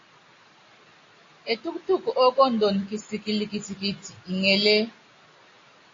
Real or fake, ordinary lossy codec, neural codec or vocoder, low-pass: real; AAC, 32 kbps; none; 7.2 kHz